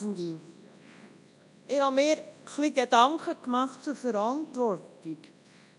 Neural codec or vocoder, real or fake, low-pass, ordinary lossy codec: codec, 24 kHz, 0.9 kbps, WavTokenizer, large speech release; fake; 10.8 kHz; none